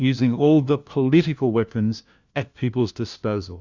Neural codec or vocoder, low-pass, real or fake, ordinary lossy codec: codec, 16 kHz, 1 kbps, FunCodec, trained on LibriTTS, 50 frames a second; 7.2 kHz; fake; Opus, 64 kbps